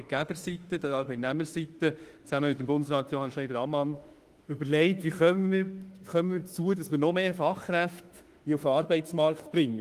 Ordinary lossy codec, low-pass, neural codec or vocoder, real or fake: Opus, 24 kbps; 14.4 kHz; autoencoder, 48 kHz, 32 numbers a frame, DAC-VAE, trained on Japanese speech; fake